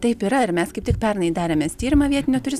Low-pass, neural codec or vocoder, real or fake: 14.4 kHz; none; real